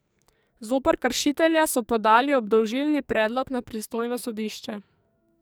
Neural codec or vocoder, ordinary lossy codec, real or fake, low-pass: codec, 44.1 kHz, 2.6 kbps, SNAC; none; fake; none